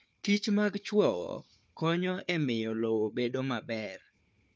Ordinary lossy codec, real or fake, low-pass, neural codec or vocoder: none; fake; none; codec, 16 kHz, 4 kbps, FunCodec, trained on Chinese and English, 50 frames a second